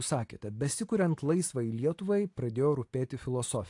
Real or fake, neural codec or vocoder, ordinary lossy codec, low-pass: real; none; AAC, 48 kbps; 10.8 kHz